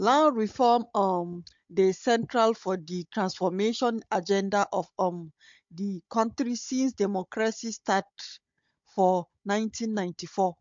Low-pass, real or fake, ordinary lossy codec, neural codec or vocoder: 7.2 kHz; fake; MP3, 48 kbps; codec, 16 kHz, 16 kbps, FunCodec, trained on Chinese and English, 50 frames a second